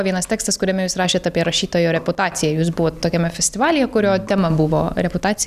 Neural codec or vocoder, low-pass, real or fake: none; 14.4 kHz; real